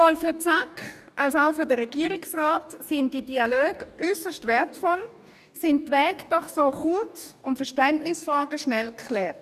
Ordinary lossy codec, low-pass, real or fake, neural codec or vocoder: none; 14.4 kHz; fake; codec, 44.1 kHz, 2.6 kbps, DAC